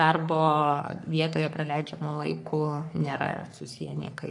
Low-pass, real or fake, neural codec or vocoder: 10.8 kHz; fake; codec, 44.1 kHz, 3.4 kbps, Pupu-Codec